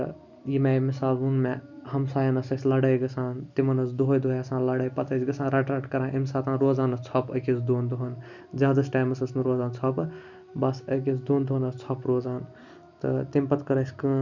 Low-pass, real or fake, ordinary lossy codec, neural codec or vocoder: 7.2 kHz; real; none; none